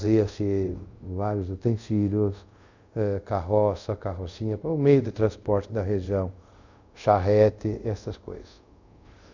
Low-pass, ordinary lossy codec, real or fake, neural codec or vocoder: 7.2 kHz; none; fake; codec, 24 kHz, 0.5 kbps, DualCodec